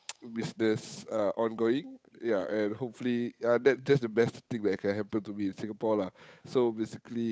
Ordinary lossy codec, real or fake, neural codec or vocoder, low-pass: none; fake; codec, 16 kHz, 8 kbps, FunCodec, trained on Chinese and English, 25 frames a second; none